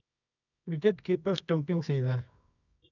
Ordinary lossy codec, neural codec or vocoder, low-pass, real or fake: none; codec, 24 kHz, 0.9 kbps, WavTokenizer, medium music audio release; 7.2 kHz; fake